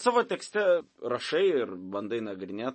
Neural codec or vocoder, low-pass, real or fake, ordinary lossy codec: none; 10.8 kHz; real; MP3, 32 kbps